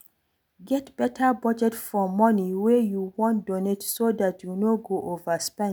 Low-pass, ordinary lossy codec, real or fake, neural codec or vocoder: none; none; real; none